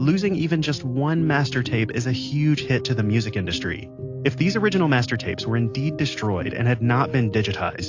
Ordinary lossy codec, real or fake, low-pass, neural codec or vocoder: AAC, 48 kbps; real; 7.2 kHz; none